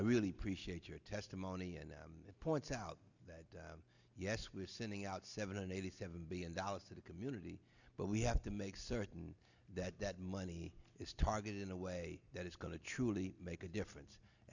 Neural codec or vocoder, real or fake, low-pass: none; real; 7.2 kHz